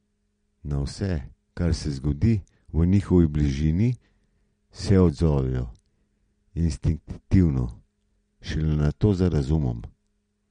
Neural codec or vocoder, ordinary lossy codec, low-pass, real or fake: none; MP3, 48 kbps; 9.9 kHz; real